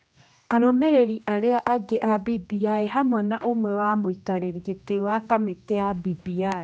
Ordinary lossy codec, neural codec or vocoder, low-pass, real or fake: none; codec, 16 kHz, 1 kbps, X-Codec, HuBERT features, trained on general audio; none; fake